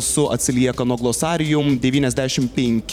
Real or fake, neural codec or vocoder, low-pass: fake; vocoder, 44.1 kHz, 128 mel bands every 512 samples, BigVGAN v2; 19.8 kHz